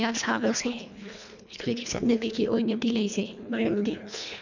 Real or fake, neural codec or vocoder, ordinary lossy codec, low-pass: fake; codec, 24 kHz, 1.5 kbps, HILCodec; none; 7.2 kHz